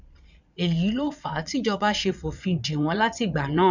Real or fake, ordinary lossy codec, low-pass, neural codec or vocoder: fake; none; 7.2 kHz; vocoder, 22.05 kHz, 80 mel bands, Vocos